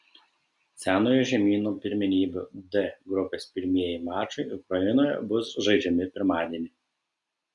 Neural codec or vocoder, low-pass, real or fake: none; 10.8 kHz; real